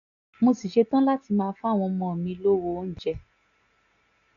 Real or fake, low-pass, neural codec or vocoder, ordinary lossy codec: real; 7.2 kHz; none; none